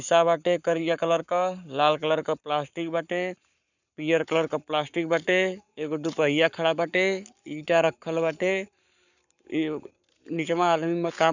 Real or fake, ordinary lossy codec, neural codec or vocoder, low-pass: fake; none; codec, 44.1 kHz, 7.8 kbps, Pupu-Codec; 7.2 kHz